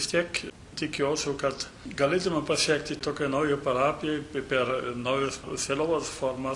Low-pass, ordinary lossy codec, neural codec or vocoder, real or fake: 10.8 kHz; Opus, 64 kbps; none; real